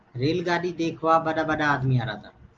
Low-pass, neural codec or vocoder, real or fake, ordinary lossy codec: 7.2 kHz; none; real; Opus, 32 kbps